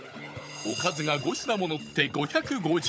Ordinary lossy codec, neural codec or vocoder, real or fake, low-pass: none; codec, 16 kHz, 16 kbps, FunCodec, trained on Chinese and English, 50 frames a second; fake; none